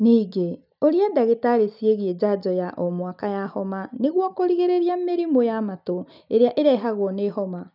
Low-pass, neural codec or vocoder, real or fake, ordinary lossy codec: 5.4 kHz; none; real; none